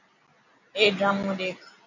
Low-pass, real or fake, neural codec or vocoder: 7.2 kHz; real; none